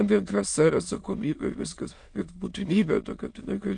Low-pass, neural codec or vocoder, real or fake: 9.9 kHz; autoencoder, 22.05 kHz, a latent of 192 numbers a frame, VITS, trained on many speakers; fake